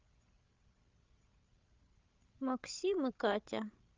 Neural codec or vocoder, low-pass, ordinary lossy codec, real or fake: codec, 16 kHz, 16 kbps, FreqCodec, larger model; 7.2 kHz; Opus, 24 kbps; fake